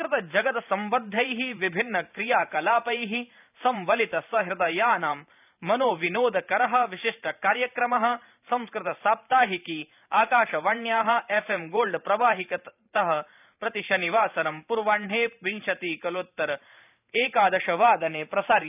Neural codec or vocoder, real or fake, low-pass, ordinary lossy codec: vocoder, 44.1 kHz, 128 mel bands every 512 samples, BigVGAN v2; fake; 3.6 kHz; none